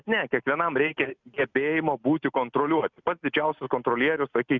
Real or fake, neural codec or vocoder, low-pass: real; none; 7.2 kHz